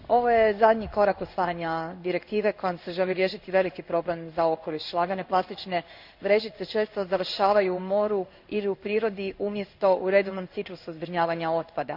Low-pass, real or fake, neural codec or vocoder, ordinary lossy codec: 5.4 kHz; fake; codec, 16 kHz in and 24 kHz out, 1 kbps, XY-Tokenizer; none